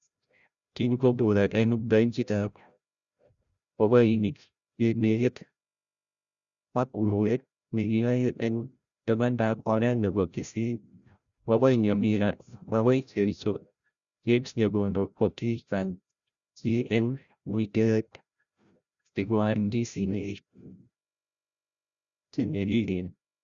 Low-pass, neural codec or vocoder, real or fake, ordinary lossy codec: 7.2 kHz; codec, 16 kHz, 0.5 kbps, FreqCodec, larger model; fake; Opus, 64 kbps